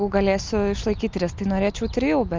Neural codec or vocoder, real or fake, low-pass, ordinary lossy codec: none; real; 7.2 kHz; Opus, 24 kbps